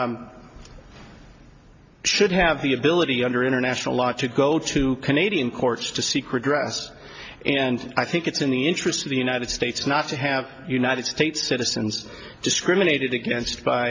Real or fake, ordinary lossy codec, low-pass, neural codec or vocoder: real; MP3, 48 kbps; 7.2 kHz; none